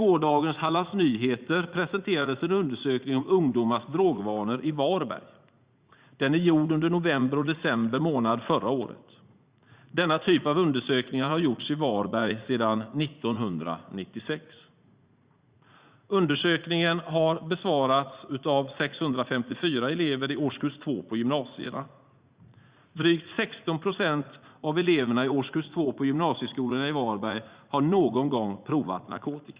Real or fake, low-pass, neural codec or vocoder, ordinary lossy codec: fake; 3.6 kHz; vocoder, 44.1 kHz, 80 mel bands, Vocos; Opus, 64 kbps